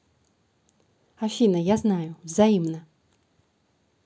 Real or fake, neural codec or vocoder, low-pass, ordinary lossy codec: real; none; none; none